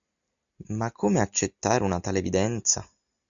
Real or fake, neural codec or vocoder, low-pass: real; none; 7.2 kHz